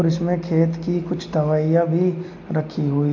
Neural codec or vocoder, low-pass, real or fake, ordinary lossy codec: none; 7.2 kHz; real; MP3, 48 kbps